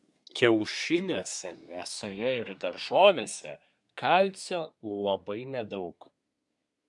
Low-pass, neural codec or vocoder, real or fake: 10.8 kHz; codec, 24 kHz, 1 kbps, SNAC; fake